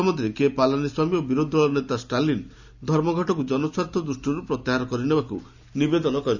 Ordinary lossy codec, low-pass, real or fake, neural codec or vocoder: none; 7.2 kHz; real; none